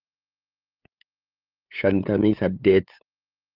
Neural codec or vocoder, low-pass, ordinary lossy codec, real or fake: codec, 16 kHz, 8 kbps, FunCodec, trained on LibriTTS, 25 frames a second; 5.4 kHz; Opus, 32 kbps; fake